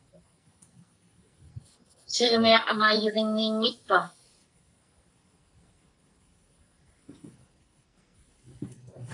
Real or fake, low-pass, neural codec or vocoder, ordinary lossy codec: fake; 10.8 kHz; codec, 44.1 kHz, 2.6 kbps, SNAC; AAC, 64 kbps